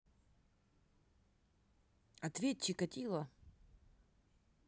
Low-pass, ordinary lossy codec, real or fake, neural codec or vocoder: none; none; real; none